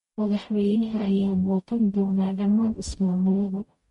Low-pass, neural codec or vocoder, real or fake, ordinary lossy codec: 19.8 kHz; codec, 44.1 kHz, 0.9 kbps, DAC; fake; MP3, 48 kbps